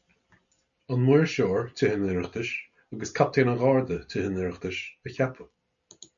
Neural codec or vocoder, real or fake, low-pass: none; real; 7.2 kHz